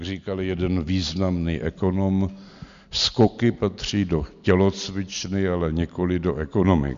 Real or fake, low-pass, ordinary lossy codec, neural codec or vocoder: real; 7.2 kHz; MP3, 64 kbps; none